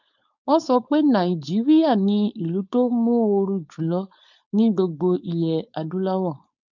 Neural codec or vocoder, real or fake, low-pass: codec, 16 kHz, 4.8 kbps, FACodec; fake; 7.2 kHz